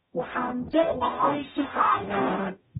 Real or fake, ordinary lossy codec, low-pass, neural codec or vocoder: fake; AAC, 16 kbps; 19.8 kHz; codec, 44.1 kHz, 0.9 kbps, DAC